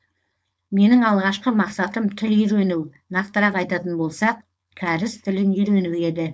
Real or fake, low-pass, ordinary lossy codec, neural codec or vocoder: fake; none; none; codec, 16 kHz, 4.8 kbps, FACodec